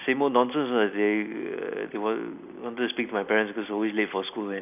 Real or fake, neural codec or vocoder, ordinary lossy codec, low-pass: real; none; none; 3.6 kHz